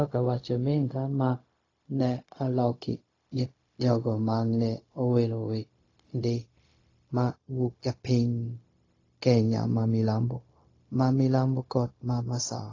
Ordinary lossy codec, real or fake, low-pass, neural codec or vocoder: AAC, 32 kbps; fake; 7.2 kHz; codec, 16 kHz, 0.4 kbps, LongCat-Audio-Codec